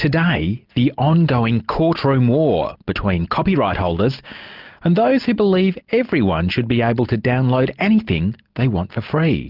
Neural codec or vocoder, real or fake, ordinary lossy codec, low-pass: none; real; Opus, 24 kbps; 5.4 kHz